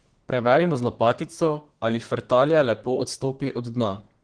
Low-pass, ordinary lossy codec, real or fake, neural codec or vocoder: 9.9 kHz; Opus, 16 kbps; fake; codec, 32 kHz, 1.9 kbps, SNAC